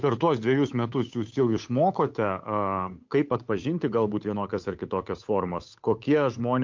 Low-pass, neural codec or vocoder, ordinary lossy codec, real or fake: 7.2 kHz; codec, 16 kHz, 8 kbps, FunCodec, trained on Chinese and English, 25 frames a second; MP3, 48 kbps; fake